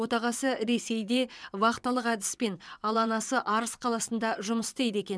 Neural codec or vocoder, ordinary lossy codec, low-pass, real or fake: vocoder, 22.05 kHz, 80 mel bands, WaveNeXt; none; none; fake